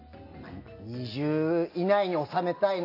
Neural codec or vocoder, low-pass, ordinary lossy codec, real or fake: none; 5.4 kHz; AAC, 32 kbps; real